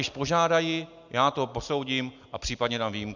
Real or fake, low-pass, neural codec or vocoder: real; 7.2 kHz; none